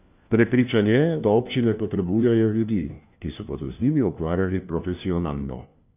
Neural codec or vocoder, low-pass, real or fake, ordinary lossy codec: codec, 16 kHz, 1 kbps, FunCodec, trained on LibriTTS, 50 frames a second; 3.6 kHz; fake; none